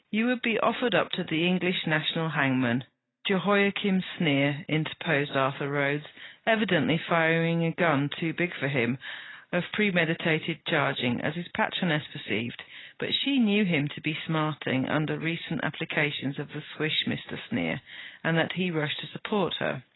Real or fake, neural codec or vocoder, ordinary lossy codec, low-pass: real; none; AAC, 16 kbps; 7.2 kHz